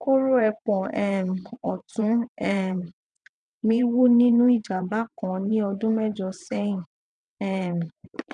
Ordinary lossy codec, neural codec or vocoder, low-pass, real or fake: none; vocoder, 22.05 kHz, 80 mel bands, WaveNeXt; 9.9 kHz; fake